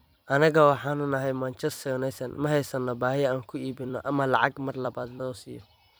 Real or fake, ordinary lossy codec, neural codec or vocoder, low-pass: fake; none; vocoder, 44.1 kHz, 128 mel bands every 256 samples, BigVGAN v2; none